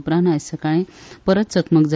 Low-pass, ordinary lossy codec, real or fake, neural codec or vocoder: none; none; real; none